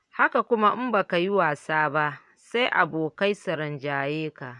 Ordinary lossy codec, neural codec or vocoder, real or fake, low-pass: none; vocoder, 24 kHz, 100 mel bands, Vocos; fake; 10.8 kHz